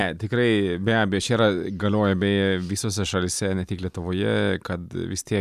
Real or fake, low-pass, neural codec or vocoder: fake; 14.4 kHz; vocoder, 44.1 kHz, 128 mel bands every 256 samples, BigVGAN v2